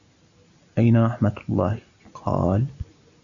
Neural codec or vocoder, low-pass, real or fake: none; 7.2 kHz; real